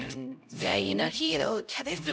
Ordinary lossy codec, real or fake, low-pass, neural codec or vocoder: none; fake; none; codec, 16 kHz, 0.5 kbps, X-Codec, HuBERT features, trained on LibriSpeech